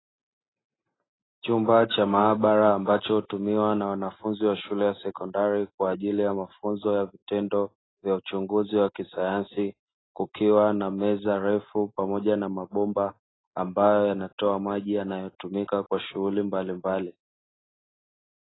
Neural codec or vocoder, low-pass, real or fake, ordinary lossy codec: none; 7.2 kHz; real; AAC, 16 kbps